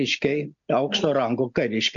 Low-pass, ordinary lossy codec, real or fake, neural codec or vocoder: 7.2 kHz; AAC, 64 kbps; real; none